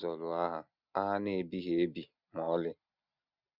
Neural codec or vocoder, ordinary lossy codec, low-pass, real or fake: none; none; 5.4 kHz; real